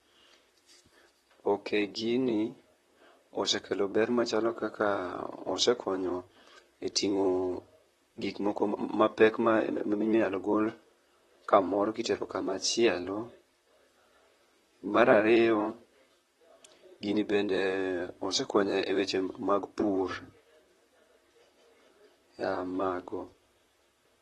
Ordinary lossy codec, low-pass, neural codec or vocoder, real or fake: AAC, 32 kbps; 19.8 kHz; vocoder, 44.1 kHz, 128 mel bands, Pupu-Vocoder; fake